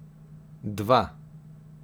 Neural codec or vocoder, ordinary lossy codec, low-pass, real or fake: none; none; none; real